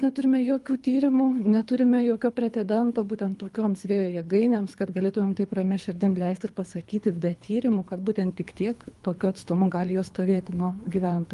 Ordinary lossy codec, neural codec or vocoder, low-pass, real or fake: Opus, 32 kbps; codec, 24 kHz, 3 kbps, HILCodec; 10.8 kHz; fake